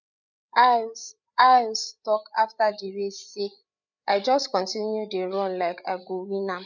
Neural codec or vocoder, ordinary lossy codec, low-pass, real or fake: codec, 16 kHz, 8 kbps, FreqCodec, larger model; none; 7.2 kHz; fake